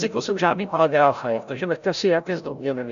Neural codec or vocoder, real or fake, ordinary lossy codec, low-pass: codec, 16 kHz, 0.5 kbps, FreqCodec, larger model; fake; AAC, 96 kbps; 7.2 kHz